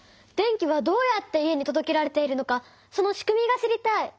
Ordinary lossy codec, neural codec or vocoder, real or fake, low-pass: none; none; real; none